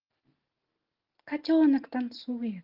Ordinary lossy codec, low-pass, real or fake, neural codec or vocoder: Opus, 16 kbps; 5.4 kHz; real; none